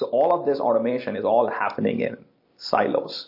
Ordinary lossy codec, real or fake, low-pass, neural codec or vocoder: MP3, 32 kbps; real; 5.4 kHz; none